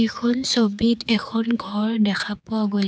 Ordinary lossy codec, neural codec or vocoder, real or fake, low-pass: none; codec, 16 kHz, 4 kbps, X-Codec, HuBERT features, trained on general audio; fake; none